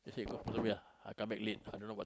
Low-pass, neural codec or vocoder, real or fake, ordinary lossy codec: none; none; real; none